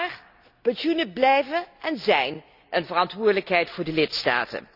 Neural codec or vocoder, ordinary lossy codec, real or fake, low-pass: none; none; real; 5.4 kHz